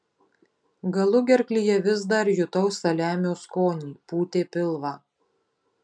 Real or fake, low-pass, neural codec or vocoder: real; 9.9 kHz; none